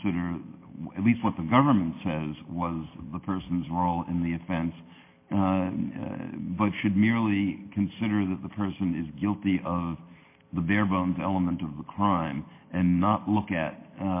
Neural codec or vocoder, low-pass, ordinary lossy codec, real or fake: none; 3.6 kHz; MP3, 24 kbps; real